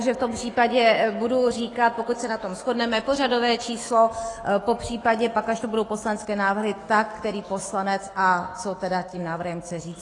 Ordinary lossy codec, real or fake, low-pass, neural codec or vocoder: AAC, 32 kbps; fake; 10.8 kHz; autoencoder, 48 kHz, 128 numbers a frame, DAC-VAE, trained on Japanese speech